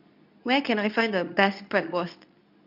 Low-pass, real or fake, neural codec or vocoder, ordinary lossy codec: 5.4 kHz; fake; codec, 24 kHz, 0.9 kbps, WavTokenizer, medium speech release version 2; none